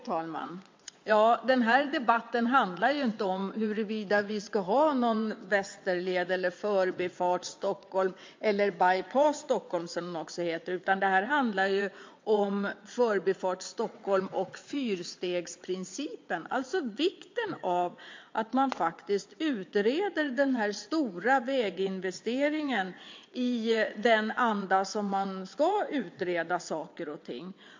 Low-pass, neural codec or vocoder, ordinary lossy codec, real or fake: 7.2 kHz; vocoder, 22.05 kHz, 80 mel bands, WaveNeXt; MP3, 48 kbps; fake